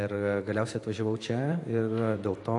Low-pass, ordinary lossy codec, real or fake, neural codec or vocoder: 10.8 kHz; AAC, 48 kbps; fake; vocoder, 44.1 kHz, 128 mel bands every 512 samples, BigVGAN v2